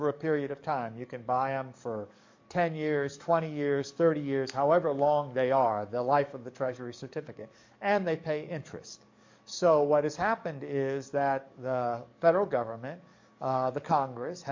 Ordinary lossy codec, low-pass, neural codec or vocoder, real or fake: MP3, 48 kbps; 7.2 kHz; codec, 44.1 kHz, 7.8 kbps, DAC; fake